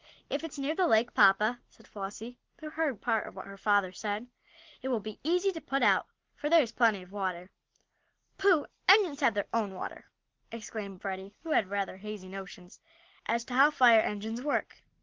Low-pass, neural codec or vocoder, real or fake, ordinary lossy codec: 7.2 kHz; none; real; Opus, 16 kbps